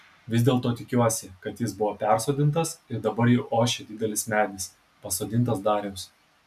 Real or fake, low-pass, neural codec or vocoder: real; 14.4 kHz; none